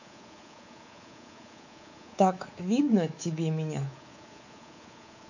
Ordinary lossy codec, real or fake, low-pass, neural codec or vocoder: none; fake; 7.2 kHz; codec, 24 kHz, 3.1 kbps, DualCodec